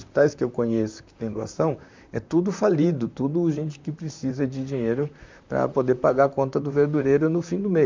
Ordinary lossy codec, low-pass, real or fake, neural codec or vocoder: MP3, 64 kbps; 7.2 kHz; fake; vocoder, 44.1 kHz, 128 mel bands, Pupu-Vocoder